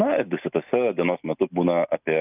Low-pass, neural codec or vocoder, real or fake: 3.6 kHz; none; real